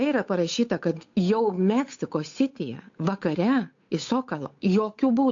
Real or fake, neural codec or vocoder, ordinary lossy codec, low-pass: fake; codec, 16 kHz, 8 kbps, FunCodec, trained on Chinese and English, 25 frames a second; AAC, 48 kbps; 7.2 kHz